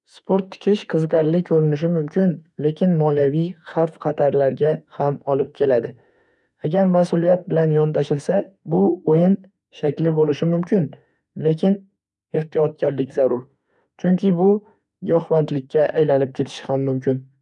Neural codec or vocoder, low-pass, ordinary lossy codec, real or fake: autoencoder, 48 kHz, 32 numbers a frame, DAC-VAE, trained on Japanese speech; 10.8 kHz; none; fake